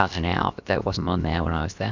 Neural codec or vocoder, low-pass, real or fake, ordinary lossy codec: codec, 16 kHz, about 1 kbps, DyCAST, with the encoder's durations; 7.2 kHz; fake; Opus, 64 kbps